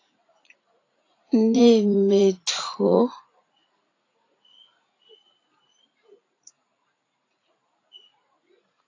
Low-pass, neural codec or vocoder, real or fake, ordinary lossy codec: 7.2 kHz; vocoder, 44.1 kHz, 80 mel bands, Vocos; fake; AAC, 32 kbps